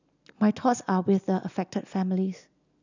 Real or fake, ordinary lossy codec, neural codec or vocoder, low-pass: real; none; none; 7.2 kHz